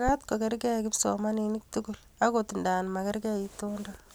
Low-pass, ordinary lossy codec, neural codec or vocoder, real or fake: none; none; none; real